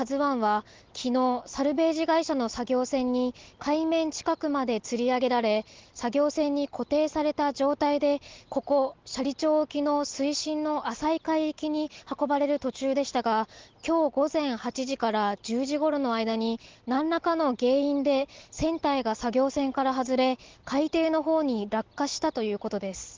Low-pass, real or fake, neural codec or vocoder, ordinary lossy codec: 7.2 kHz; real; none; Opus, 16 kbps